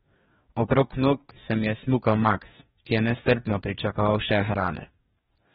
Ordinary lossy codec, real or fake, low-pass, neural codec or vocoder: AAC, 16 kbps; fake; 19.8 kHz; codec, 44.1 kHz, 2.6 kbps, DAC